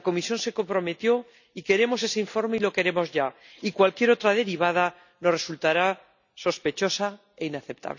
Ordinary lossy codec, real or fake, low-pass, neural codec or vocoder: none; real; 7.2 kHz; none